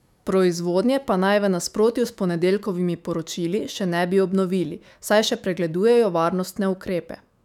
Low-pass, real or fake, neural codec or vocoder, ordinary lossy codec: 19.8 kHz; fake; autoencoder, 48 kHz, 128 numbers a frame, DAC-VAE, trained on Japanese speech; none